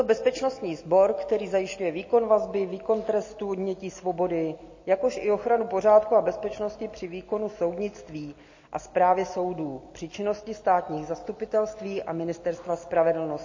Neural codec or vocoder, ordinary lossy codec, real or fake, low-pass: none; MP3, 32 kbps; real; 7.2 kHz